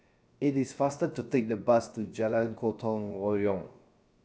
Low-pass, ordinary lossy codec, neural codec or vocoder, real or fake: none; none; codec, 16 kHz, 0.7 kbps, FocalCodec; fake